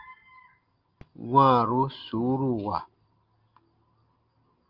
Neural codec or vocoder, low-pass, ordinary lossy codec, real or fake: none; 5.4 kHz; Opus, 24 kbps; real